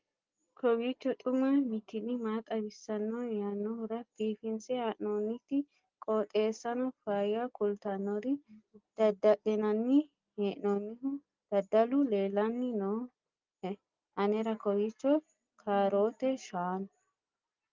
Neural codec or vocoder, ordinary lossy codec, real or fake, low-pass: none; Opus, 24 kbps; real; 7.2 kHz